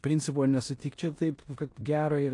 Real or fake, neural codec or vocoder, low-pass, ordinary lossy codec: fake; codec, 16 kHz in and 24 kHz out, 0.9 kbps, LongCat-Audio-Codec, four codebook decoder; 10.8 kHz; AAC, 48 kbps